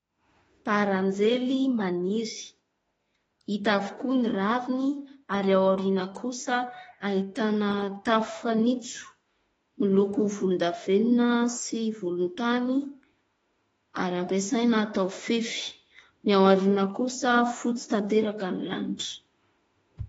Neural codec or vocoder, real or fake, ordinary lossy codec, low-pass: autoencoder, 48 kHz, 32 numbers a frame, DAC-VAE, trained on Japanese speech; fake; AAC, 24 kbps; 19.8 kHz